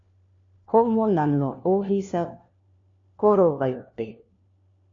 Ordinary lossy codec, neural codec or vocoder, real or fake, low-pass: MP3, 48 kbps; codec, 16 kHz, 1 kbps, FunCodec, trained on LibriTTS, 50 frames a second; fake; 7.2 kHz